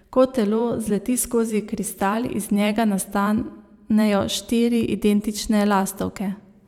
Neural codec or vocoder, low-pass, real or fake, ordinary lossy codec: vocoder, 44.1 kHz, 128 mel bands every 512 samples, BigVGAN v2; 19.8 kHz; fake; none